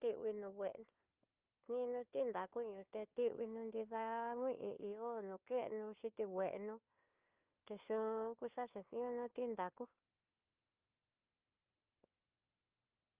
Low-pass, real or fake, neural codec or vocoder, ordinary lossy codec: 3.6 kHz; fake; codec, 16 kHz, 4 kbps, FunCodec, trained on LibriTTS, 50 frames a second; Opus, 24 kbps